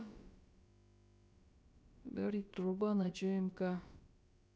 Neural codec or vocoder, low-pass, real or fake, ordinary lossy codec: codec, 16 kHz, about 1 kbps, DyCAST, with the encoder's durations; none; fake; none